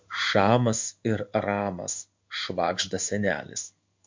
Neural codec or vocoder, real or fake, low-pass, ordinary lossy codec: codec, 16 kHz in and 24 kHz out, 1 kbps, XY-Tokenizer; fake; 7.2 kHz; MP3, 48 kbps